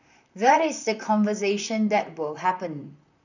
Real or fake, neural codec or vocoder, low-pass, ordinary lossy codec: fake; vocoder, 44.1 kHz, 128 mel bands, Pupu-Vocoder; 7.2 kHz; none